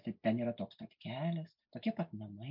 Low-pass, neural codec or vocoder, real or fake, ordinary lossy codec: 5.4 kHz; none; real; MP3, 48 kbps